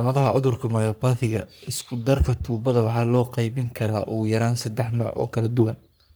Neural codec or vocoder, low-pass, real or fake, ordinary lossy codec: codec, 44.1 kHz, 3.4 kbps, Pupu-Codec; none; fake; none